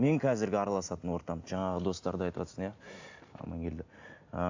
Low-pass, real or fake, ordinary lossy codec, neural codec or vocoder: 7.2 kHz; real; AAC, 48 kbps; none